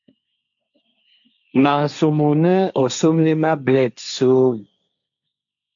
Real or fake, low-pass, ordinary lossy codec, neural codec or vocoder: fake; 7.2 kHz; MP3, 64 kbps; codec, 16 kHz, 1.1 kbps, Voila-Tokenizer